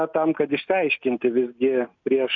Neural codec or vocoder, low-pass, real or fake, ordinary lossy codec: none; 7.2 kHz; real; MP3, 64 kbps